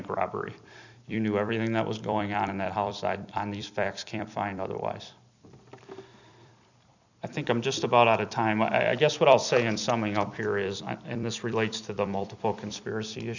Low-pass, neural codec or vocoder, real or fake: 7.2 kHz; vocoder, 44.1 kHz, 128 mel bands every 256 samples, BigVGAN v2; fake